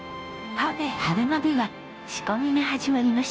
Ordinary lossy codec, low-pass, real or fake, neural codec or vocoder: none; none; fake; codec, 16 kHz, 0.5 kbps, FunCodec, trained on Chinese and English, 25 frames a second